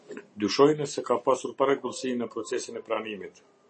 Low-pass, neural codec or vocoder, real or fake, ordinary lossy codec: 10.8 kHz; none; real; MP3, 32 kbps